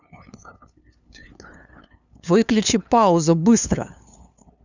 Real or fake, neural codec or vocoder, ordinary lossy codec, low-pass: fake; codec, 16 kHz, 2 kbps, FunCodec, trained on LibriTTS, 25 frames a second; none; 7.2 kHz